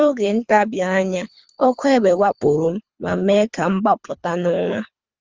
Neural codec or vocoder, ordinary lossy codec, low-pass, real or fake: codec, 24 kHz, 3 kbps, HILCodec; Opus, 32 kbps; 7.2 kHz; fake